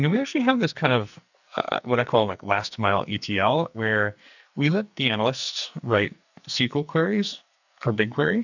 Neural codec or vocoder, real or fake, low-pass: codec, 44.1 kHz, 2.6 kbps, SNAC; fake; 7.2 kHz